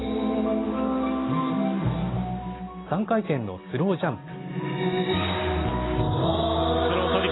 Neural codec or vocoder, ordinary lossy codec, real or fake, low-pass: none; AAC, 16 kbps; real; 7.2 kHz